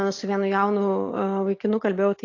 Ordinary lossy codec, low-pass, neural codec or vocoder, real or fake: AAC, 48 kbps; 7.2 kHz; none; real